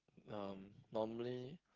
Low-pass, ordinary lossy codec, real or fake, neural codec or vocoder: 7.2 kHz; Opus, 16 kbps; fake; codec, 16 kHz, 16 kbps, FreqCodec, smaller model